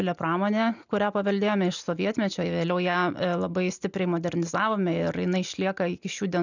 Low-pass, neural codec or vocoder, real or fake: 7.2 kHz; none; real